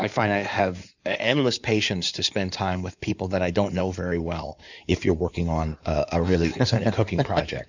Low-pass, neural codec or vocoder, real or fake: 7.2 kHz; codec, 16 kHz in and 24 kHz out, 2.2 kbps, FireRedTTS-2 codec; fake